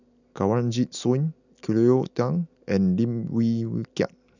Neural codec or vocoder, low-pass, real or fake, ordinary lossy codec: none; 7.2 kHz; real; none